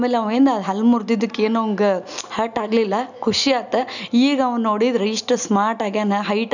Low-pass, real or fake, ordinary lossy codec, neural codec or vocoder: 7.2 kHz; real; none; none